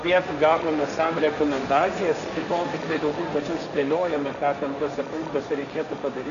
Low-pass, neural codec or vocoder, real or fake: 7.2 kHz; codec, 16 kHz, 1.1 kbps, Voila-Tokenizer; fake